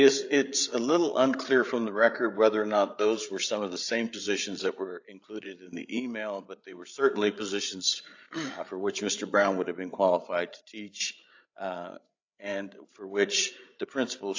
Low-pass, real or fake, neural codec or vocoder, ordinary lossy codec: 7.2 kHz; fake; codec, 16 kHz, 8 kbps, FreqCodec, larger model; AAC, 48 kbps